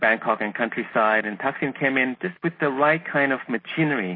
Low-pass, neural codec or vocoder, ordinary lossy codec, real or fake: 5.4 kHz; none; MP3, 24 kbps; real